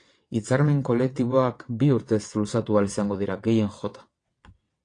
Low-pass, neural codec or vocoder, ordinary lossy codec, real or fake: 9.9 kHz; vocoder, 22.05 kHz, 80 mel bands, WaveNeXt; AAC, 48 kbps; fake